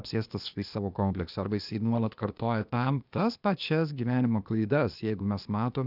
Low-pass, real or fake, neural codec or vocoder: 5.4 kHz; fake; codec, 16 kHz, 0.8 kbps, ZipCodec